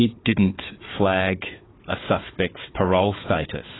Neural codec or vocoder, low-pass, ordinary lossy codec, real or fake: codec, 16 kHz, 4 kbps, FunCodec, trained on Chinese and English, 50 frames a second; 7.2 kHz; AAC, 16 kbps; fake